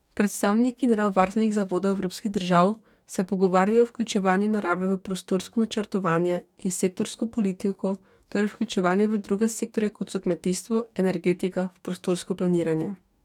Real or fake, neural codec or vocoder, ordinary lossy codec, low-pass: fake; codec, 44.1 kHz, 2.6 kbps, DAC; none; 19.8 kHz